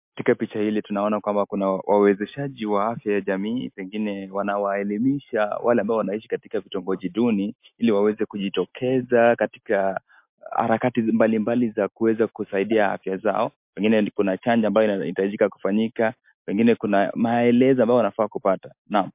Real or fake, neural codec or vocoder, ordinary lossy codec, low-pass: real; none; MP3, 32 kbps; 3.6 kHz